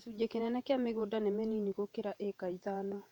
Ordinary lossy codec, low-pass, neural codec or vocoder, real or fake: none; 19.8 kHz; vocoder, 48 kHz, 128 mel bands, Vocos; fake